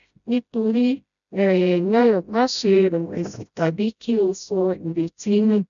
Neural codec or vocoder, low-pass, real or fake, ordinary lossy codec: codec, 16 kHz, 0.5 kbps, FreqCodec, smaller model; 7.2 kHz; fake; none